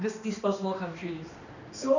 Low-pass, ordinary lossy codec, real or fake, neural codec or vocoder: 7.2 kHz; none; fake; codec, 16 kHz, 2 kbps, X-Codec, HuBERT features, trained on balanced general audio